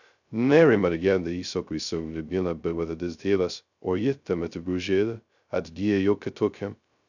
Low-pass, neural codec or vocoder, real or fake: 7.2 kHz; codec, 16 kHz, 0.2 kbps, FocalCodec; fake